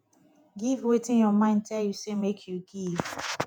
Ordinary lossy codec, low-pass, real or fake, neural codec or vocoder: none; 19.8 kHz; fake; vocoder, 48 kHz, 128 mel bands, Vocos